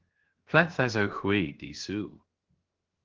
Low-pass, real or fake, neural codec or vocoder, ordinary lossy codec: 7.2 kHz; fake; codec, 16 kHz, 0.7 kbps, FocalCodec; Opus, 16 kbps